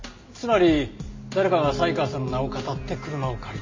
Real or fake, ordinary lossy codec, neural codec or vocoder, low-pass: real; MP3, 32 kbps; none; 7.2 kHz